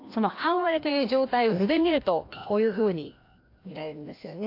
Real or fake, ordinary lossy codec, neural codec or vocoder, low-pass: fake; AAC, 32 kbps; codec, 16 kHz, 1 kbps, FreqCodec, larger model; 5.4 kHz